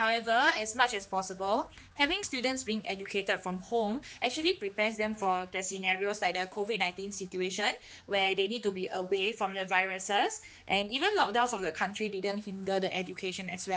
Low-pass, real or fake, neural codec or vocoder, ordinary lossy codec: none; fake; codec, 16 kHz, 2 kbps, X-Codec, HuBERT features, trained on general audio; none